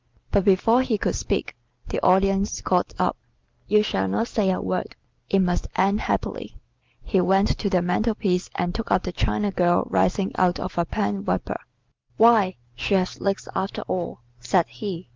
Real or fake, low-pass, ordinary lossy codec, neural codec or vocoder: real; 7.2 kHz; Opus, 32 kbps; none